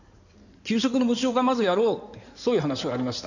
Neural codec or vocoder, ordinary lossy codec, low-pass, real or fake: codec, 16 kHz in and 24 kHz out, 2.2 kbps, FireRedTTS-2 codec; none; 7.2 kHz; fake